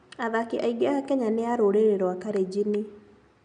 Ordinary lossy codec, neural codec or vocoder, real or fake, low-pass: none; none; real; 9.9 kHz